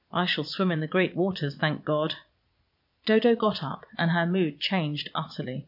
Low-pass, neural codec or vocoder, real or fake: 5.4 kHz; vocoder, 22.05 kHz, 80 mel bands, Vocos; fake